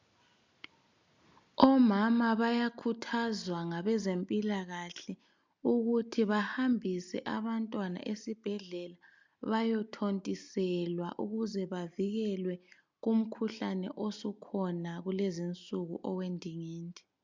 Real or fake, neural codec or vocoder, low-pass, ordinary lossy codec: real; none; 7.2 kHz; MP3, 64 kbps